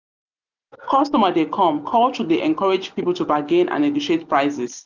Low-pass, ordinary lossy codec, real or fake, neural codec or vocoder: 7.2 kHz; none; real; none